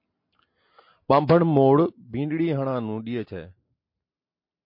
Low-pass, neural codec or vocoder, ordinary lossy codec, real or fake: 5.4 kHz; none; MP3, 32 kbps; real